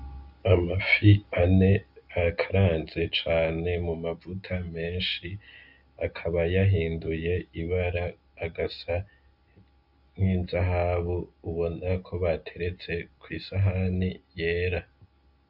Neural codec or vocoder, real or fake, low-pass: none; real; 5.4 kHz